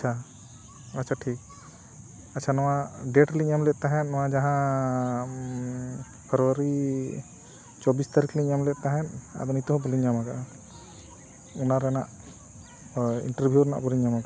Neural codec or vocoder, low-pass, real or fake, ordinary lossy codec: none; none; real; none